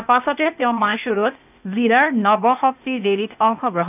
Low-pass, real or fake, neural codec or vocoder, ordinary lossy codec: 3.6 kHz; fake; codec, 16 kHz, 0.8 kbps, ZipCodec; none